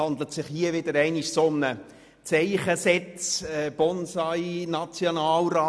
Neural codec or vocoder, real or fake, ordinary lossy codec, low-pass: none; real; none; none